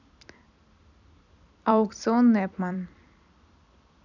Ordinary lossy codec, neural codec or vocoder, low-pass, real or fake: none; vocoder, 44.1 kHz, 128 mel bands every 256 samples, BigVGAN v2; 7.2 kHz; fake